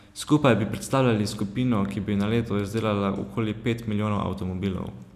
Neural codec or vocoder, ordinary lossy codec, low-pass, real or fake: none; none; 14.4 kHz; real